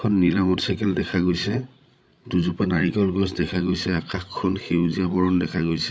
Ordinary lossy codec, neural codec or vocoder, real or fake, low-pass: none; codec, 16 kHz, 16 kbps, FreqCodec, larger model; fake; none